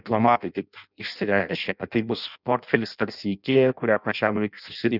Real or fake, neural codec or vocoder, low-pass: fake; codec, 16 kHz in and 24 kHz out, 0.6 kbps, FireRedTTS-2 codec; 5.4 kHz